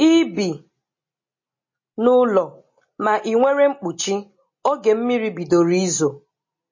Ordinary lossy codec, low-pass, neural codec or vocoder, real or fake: MP3, 32 kbps; 7.2 kHz; none; real